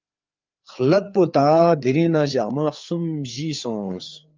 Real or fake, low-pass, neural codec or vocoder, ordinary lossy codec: fake; 7.2 kHz; codec, 16 kHz, 4 kbps, FreqCodec, larger model; Opus, 24 kbps